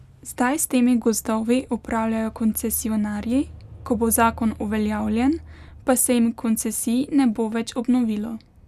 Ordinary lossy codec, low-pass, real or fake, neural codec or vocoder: none; 14.4 kHz; real; none